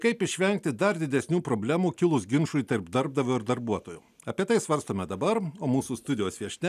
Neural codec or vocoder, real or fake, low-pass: none; real; 14.4 kHz